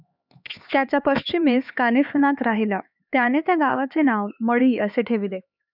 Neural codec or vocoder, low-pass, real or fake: codec, 16 kHz, 4 kbps, X-Codec, HuBERT features, trained on LibriSpeech; 5.4 kHz; fake